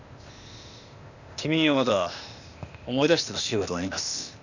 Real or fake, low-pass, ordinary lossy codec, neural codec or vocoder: fake; 7.2 kHz; none; codec, 16 kHz, 0.8 kbps, ZipCodec